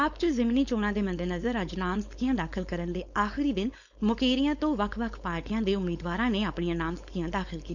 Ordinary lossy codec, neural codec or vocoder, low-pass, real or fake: none; codec, 16 kHz, 4.8 kbps, FACodec; 7.2 kHz; fake